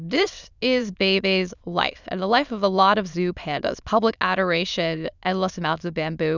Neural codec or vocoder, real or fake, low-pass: autoencoder, 22.05 kHz, a latent of 192 numbers a frame, VITS, trained on many speakers; fake; 7.2 kHz